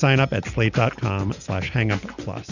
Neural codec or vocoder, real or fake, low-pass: none; real; 7.2 kHz